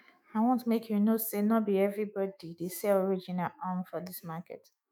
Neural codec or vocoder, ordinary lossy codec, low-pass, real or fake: autoencoder, 48 kHz, 128 numbers a frame, DAC-VAE, trained on Japanese speech; none; none; fake